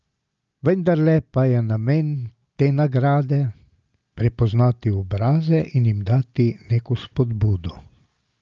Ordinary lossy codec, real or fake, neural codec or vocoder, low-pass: Opus, 24 kbps; real; none; 7.2 kHz